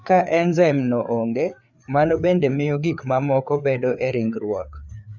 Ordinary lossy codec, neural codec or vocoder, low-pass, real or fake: none; codec, 16 kHz, 4 kbps, FreqCodec, larger model; 7.2 kHz; fake